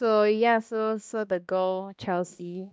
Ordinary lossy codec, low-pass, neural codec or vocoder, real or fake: none; none; codec, 16 kHz, 1 kbps, X-Codec, HuBERT features, trained on balanced general audio; fake